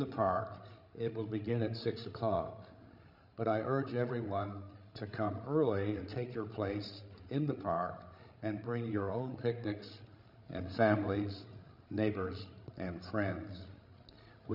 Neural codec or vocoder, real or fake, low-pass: codec, 16 kHz, 16 kbps, FreqCodec, larger model; fake; 5.4 kHz